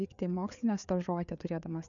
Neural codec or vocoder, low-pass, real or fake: codec, 16 kHz, 4 kbps, FreqCodec, larger model; 7.2 kHz; fake